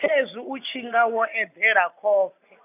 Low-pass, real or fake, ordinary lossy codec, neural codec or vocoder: 3.6 kHz; real; none; none